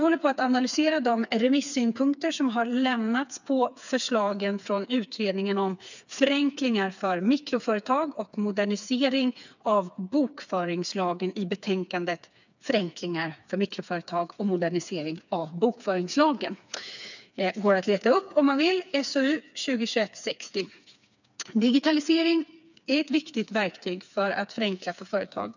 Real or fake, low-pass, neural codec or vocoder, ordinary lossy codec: fake; 7.2 kHz; codec, 16 kHz, 4 kbps, FreqCodec, smaller model; none